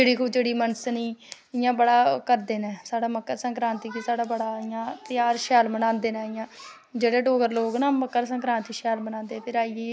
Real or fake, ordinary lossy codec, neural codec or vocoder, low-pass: real; none; none; none